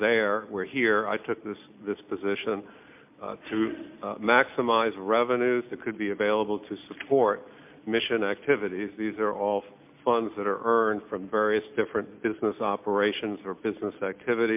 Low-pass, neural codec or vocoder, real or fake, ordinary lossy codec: 3.6 kHz; none; real; MP3, 32 kbps